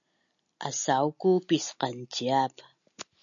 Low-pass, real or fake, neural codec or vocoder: 7.2 kHz; real; none